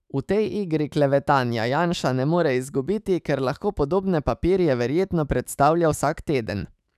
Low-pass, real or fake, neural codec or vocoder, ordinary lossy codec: 14.4 kHz; fake; autoencoder, 48 kHz, 128 numbers a frame, DAC-VAE, trained on Japanese speech; none